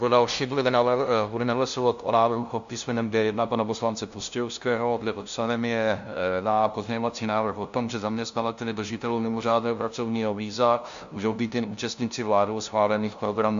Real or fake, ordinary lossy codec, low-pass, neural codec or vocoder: fake; MP3, 64 kbps; 7.2 kHz; codec, 16 kHz, 0.5 kbps, FunCodec, trained on LibriTTS, 25 frames a second